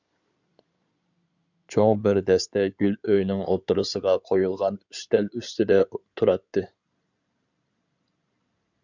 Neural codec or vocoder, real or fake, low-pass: codec, 16 kHz in and 24 kHz out, 2.2 kbps, FireRedTTS-2 codec; fake; 7.2 kHz